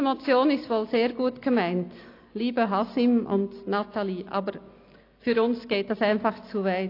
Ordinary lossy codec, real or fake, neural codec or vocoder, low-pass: AAC, 32 kbps; real; none; 5.4 kHz